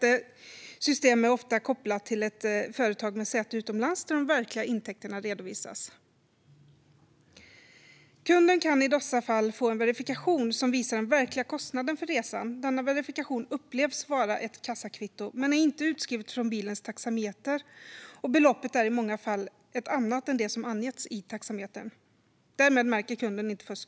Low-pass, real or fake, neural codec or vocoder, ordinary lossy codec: none; real; none; none